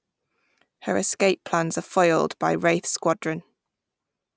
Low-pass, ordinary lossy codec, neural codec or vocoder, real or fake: none; none; none; real